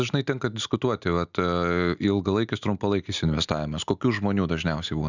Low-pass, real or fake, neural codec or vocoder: 7.2 kHz; real; none